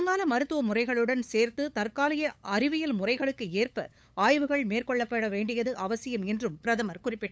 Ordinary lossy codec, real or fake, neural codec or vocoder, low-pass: none; fake; codec, 16 kHz, 8 kbps, FunCodec, trained on LibriTTS, 25 frames a second; none